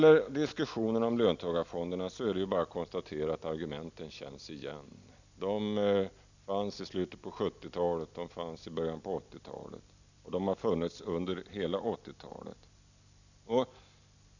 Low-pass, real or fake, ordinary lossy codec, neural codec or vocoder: 7.2 kHz; real; none; none